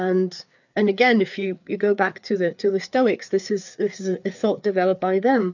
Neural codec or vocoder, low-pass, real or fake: codec, 16 kHz, 4 kbps, FreqCodec, larger model; 7.2 kHz; fake